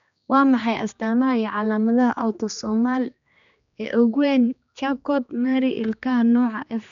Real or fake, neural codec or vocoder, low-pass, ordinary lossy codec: fake; codec, 16 kHz, 2 kbps, X-Codec, HuBERT features, trained on general audio; 7.2 kHz; MP3, 96 kbps